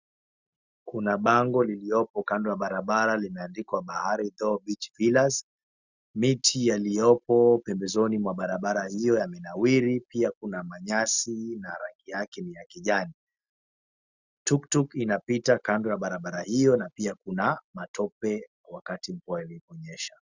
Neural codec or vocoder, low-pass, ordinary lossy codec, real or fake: none; 7.2 kHz; Opus, 64 kbps; real